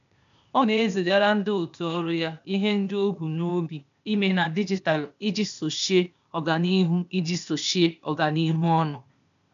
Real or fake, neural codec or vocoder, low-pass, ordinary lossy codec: fake; codec, 16 kHz, 0.8 kbps, ZipCodec; 7.2 kHz; none